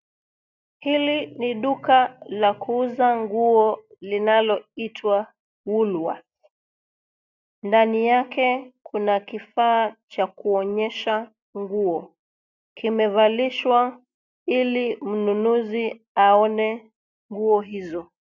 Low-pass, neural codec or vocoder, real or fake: 7.2 kHz; none; real